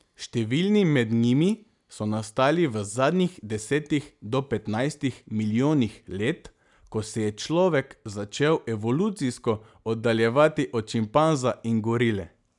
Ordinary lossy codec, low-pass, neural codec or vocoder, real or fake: none; 10.8 kHz; none; real